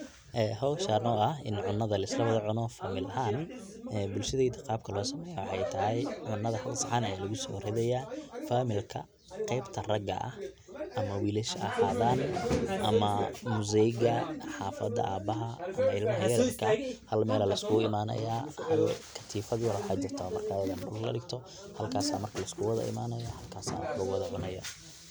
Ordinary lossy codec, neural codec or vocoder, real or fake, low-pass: none; none; real; none